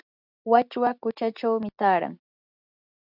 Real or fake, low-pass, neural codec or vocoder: real; 5.4 kHz; none